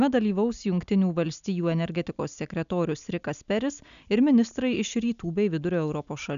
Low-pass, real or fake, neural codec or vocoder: 7.2 kHz; real; none